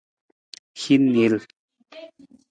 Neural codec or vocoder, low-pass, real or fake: none; 9.9 kHz; real